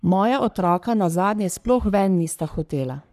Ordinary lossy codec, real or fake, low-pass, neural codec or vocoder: none; fake; 14.4 kHz; codec, 44.1 kHz, 3.4 kbps, Pupu-Codec